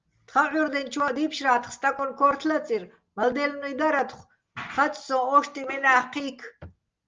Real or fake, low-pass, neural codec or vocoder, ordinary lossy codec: real; 7.2 kHz; none; Opus, 24 kbps